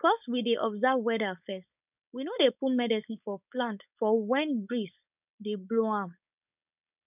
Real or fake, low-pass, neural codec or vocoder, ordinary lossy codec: fake; 3.6 kHz; codec, 16 kHz, 4.8 kbps, FACodec; none